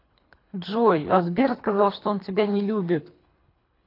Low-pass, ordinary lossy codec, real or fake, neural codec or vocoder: 5.4 kHz; AAC, 32 kbps; fake; codec, 24 kHz, 3 kbps, HILCodec